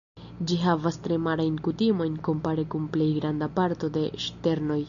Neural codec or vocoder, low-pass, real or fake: none; 7.2 kHz; real